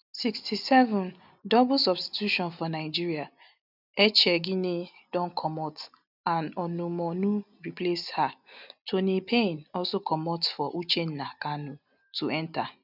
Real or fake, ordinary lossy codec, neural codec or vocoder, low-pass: real; none; none; 5.4 kHz